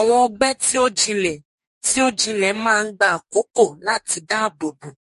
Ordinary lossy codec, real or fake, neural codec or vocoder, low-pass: MP3, 48 kbps; fake; codec, 44.1 kHz, 2.6 kbps, DAC; 14.4 kHz